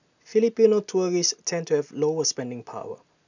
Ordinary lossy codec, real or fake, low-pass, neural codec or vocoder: none; real; 7.2 kHz; none